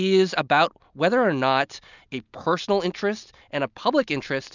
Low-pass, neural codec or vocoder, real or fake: 7.2 kHz; none; real